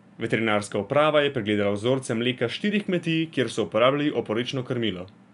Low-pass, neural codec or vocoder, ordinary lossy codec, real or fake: 10.8 kHz; none; none; real